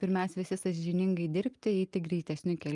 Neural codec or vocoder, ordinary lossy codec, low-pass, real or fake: none; Opus, 32 kbps; 10.8 kHz; real